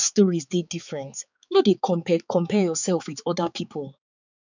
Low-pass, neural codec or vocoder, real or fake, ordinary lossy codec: 7.2 kHz; codec, 16 kHz, 4 kbps, X-Codec, HuBERT features, trained on balanced general audio; fake; none